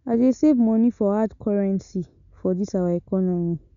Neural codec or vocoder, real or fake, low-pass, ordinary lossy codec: none; real; 7.2 kHz; none